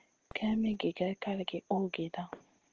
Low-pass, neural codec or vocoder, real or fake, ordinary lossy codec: 7.2 kHz; none; real; Opus, 16 kbps